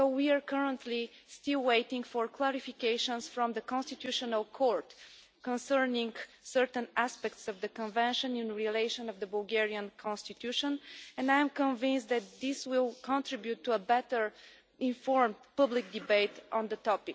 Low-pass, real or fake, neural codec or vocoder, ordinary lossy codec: none; real; none; none